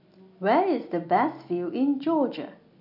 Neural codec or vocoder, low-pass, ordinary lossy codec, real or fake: none; 5.4 kHz; none; real